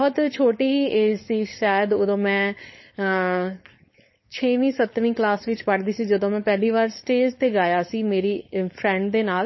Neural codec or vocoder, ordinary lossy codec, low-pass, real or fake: codec, 16 kHz, 4.8 kbps, FACodec; MP3, 24 kbps; 7.2 kHz; fake